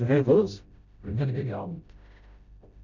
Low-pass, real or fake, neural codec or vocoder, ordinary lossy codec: 7.2 kHz; fake; codec, 16 kHz, 0.5 kbps, FreqCodec, smaller model; none